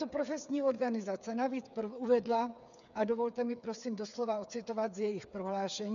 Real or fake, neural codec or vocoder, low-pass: fake; codec, 16 kHz, 8 kbps, FreqCodec, smaller model; 7.2 kHz